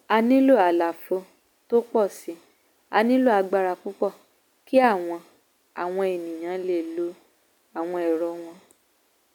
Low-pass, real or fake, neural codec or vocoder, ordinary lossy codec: 19.8 kHz; real; none; none